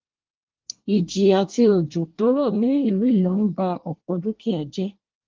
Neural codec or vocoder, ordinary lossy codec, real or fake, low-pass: codec, 24 kHz, 1 kbps, SNAC; Opus, 32 kbps; fake; 7.2 kHz